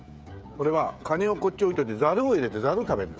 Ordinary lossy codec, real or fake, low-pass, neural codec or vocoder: none; fake; none; codec, 16 kHz, 16 kbps, FreqCodec, smaller model